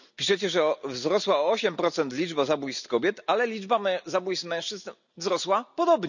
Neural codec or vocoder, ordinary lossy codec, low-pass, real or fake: none; none; 7.2 kHz; real